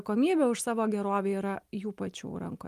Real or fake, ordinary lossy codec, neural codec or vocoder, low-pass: real; Opus, 32 kbps; none; 14.4 kHz